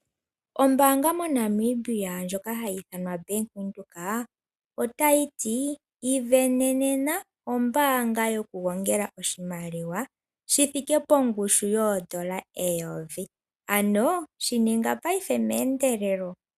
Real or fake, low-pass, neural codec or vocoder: real; 14.4 kHz; none